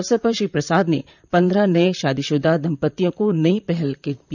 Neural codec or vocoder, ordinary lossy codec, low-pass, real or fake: vocoder, 44.1 kHz, 128 mel bands, Pupu-Vocoder; none; 7.2 kHz; fake